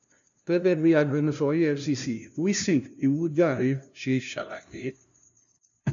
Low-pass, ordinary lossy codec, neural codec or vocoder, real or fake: 7.2 kHz; none; codec, 16 kHz, 0.5 kbps, FunCodec, trained on LibriTTS, 25 frames a second; fake